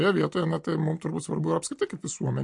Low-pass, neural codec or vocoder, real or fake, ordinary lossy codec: 10.8 kHz; none; real; MP3, 48 kbps